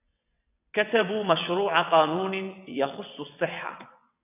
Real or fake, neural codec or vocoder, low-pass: fake; vocoder, 22.05 kHz, 80 mel bands, WaveNeXt; 3.6 kHz